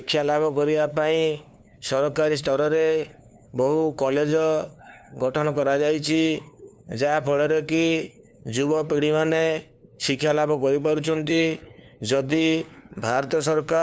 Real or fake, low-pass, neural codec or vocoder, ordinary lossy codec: fake; none; codec, 16 kHz, 2 kbps, FunCodec, trained on LibriTTS, 25 frames a second; none